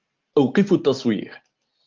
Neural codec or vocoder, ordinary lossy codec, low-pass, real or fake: none; Opus, 32 kbps; 7.2 kHz; real